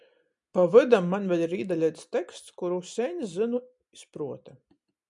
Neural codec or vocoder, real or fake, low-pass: none; real; 10.8 kHz